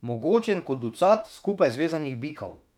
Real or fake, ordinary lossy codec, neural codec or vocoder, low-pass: fake; none; autoencoder, 48 kHz, 32 numbers a frame, DAC-VAE, trained on Japanese speech; 19.8 kHz